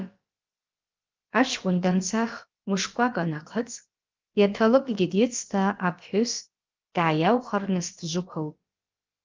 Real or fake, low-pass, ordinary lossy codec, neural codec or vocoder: fake; 7.2 kHz; Opus, 24 kbps; codec, 16 kHz, about 1 kbps, DyCAST, with the encoder's durations